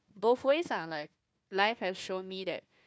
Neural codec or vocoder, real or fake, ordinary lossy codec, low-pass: codec, 16 kHz, 1 kbps, FunCodec, trained on Chinese and English, 50 frames a second; fake; none; none